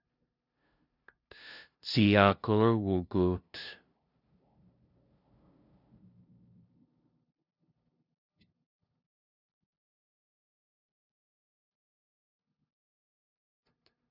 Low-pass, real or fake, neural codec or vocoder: 5.4 kHz; fake; codec, 16 kHz, 0.5 kbps, FunCodec, trained on LibriTTS, 25 frames a second